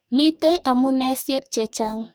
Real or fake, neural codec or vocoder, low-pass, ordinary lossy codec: fake; codec, 44.1 kHz, 2.6 kbps, DAC; none; none